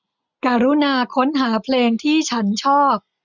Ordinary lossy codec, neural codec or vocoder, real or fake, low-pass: none; none; real; 7.2 kHz